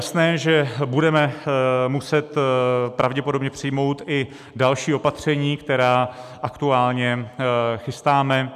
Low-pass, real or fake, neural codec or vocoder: 14.4 kHz; real; none